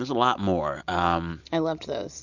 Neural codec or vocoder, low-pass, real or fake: none; 7.2 kHz; real